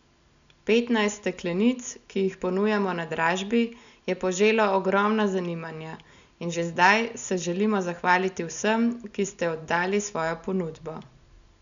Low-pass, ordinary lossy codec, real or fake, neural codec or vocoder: 7.2 kHz; none; real; none